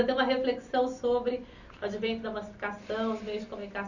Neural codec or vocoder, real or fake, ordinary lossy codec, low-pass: none; real; none; 7.2 kHz